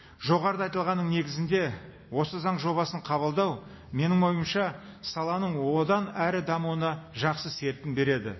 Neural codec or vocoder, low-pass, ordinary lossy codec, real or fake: none; 7.2 kHz; MP3, 24 kbps; real